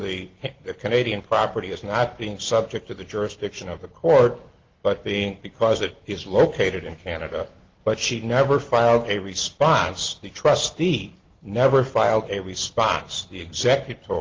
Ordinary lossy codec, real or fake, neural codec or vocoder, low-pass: Opus, 16 kbps; real; none; 7.2 kHz